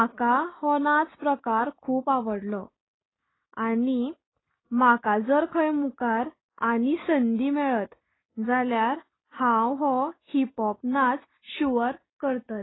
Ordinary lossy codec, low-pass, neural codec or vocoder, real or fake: AAC, 16 kbps; 7.2 kHz; none; real